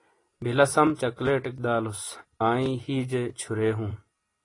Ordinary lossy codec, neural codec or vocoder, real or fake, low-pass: AAC, 32 kbps; none; real; 10.8 kHz